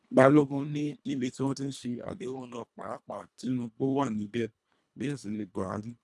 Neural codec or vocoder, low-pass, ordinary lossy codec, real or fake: codec, 24 kHz, 1.5 kbps, HILCodec; none; none; fake